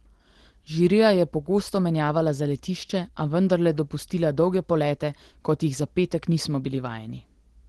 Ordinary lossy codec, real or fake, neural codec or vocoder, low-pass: Opus, 16 kbps; real; none; 10.8 kHz